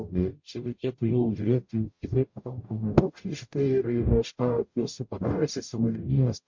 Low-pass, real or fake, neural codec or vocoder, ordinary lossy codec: 7.2 kHz; fake; codec, 44.1 kHz, 0.9 kbps, DAC; MP3, 48 kbps